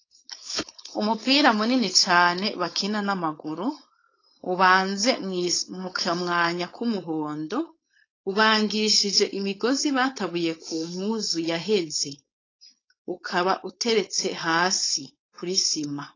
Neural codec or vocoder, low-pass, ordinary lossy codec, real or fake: codec, 16 kHz, 4.8 kbps, FACodec; 7.2 kHz; AAC, 32 kbps; fake